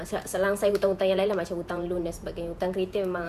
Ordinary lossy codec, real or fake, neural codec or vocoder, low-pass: none; fake; vocoder, 48 kHz, 128 mel bands, Vocos; 14.4 kHz